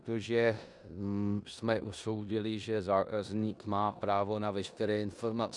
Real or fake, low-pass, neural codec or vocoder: fake; 10.8 kHz; codec, 16 kHz in and 24 kHz out, 0.9 kbps, LongCat-Audio-Codec, four codebook decoder